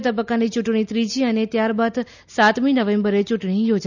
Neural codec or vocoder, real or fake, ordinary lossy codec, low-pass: none; real; none; 7.2 kHz